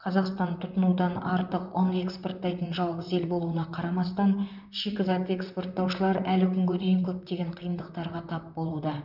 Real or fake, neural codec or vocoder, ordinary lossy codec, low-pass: fake; vocoder, 44.1 kHz, 128 mel bands, Pupu-Vocoder; none; 5.4 kHz